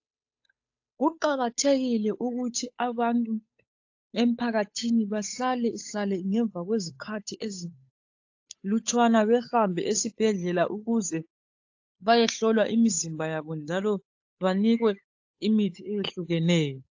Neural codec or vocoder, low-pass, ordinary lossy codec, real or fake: codec, 16 kHz, 8 kbps, FunCodec, trained on Chinese and English, 25 frames a second; 7.2 kHz; AAC, 48 kbps; fake